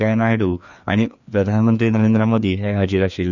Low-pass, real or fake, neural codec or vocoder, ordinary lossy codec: 7.2 kHz; fake; codec, 16 kHz, 2 kbps, FreqCodec, larger model; none